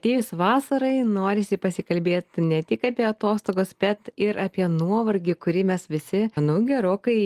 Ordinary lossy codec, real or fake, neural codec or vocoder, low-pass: Opus, 24 kbps; real; none; 14.4 kHz